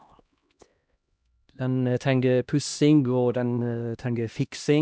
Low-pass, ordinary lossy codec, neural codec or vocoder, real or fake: none; none; codec, 16 kHz, 1 kbps, X-Codec, HuBERT features, trained on LibriSpeech; fake